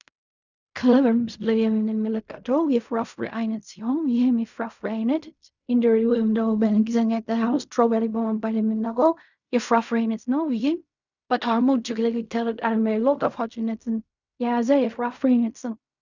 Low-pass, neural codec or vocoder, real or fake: 7.2 kHz; codec, 16 kHz in and 24 kHz out, 0.4 kbps, LongCat-Audio-Codec, fine tuned four codebook decoder; fake